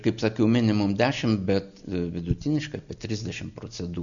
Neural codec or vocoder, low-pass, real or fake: none; 7.2 kHz; real